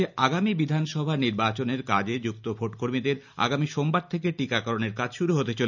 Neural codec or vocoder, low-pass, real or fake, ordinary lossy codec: none; 7.2 kHz; real; none